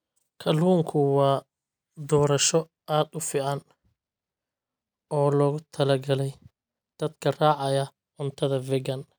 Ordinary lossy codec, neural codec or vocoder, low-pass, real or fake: none; none; none; real